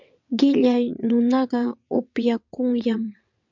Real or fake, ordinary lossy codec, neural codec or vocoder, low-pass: fake; MP3, 64 kbps; vocoder, 22.05 kHz, 80 mel bands, WaveNeXt; 7.2 kHz